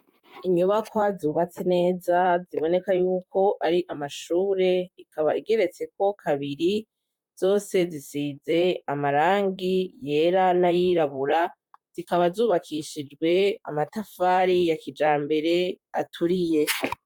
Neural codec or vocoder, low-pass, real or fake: vocoder, 44.1 kHz, 128 mel bands, Pupu-Vocoder; 19.8 kHz; fake